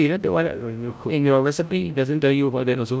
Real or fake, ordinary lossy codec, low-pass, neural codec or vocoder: fake; none; none; codec, 16 kHz, 0.5 kbps, FreqCodec, larger model